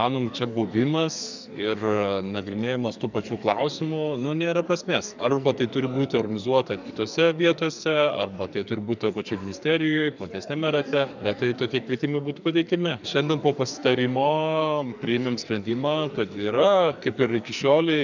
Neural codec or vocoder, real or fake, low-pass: codec, 44.1 kHz, 2.6 kbps, SNAC; fake; 7.2 kHz